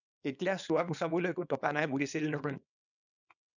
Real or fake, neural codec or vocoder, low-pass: fake; codec, 24 kHz, 0.9 kbps, WavTokenizer, small release; 7.2 kHz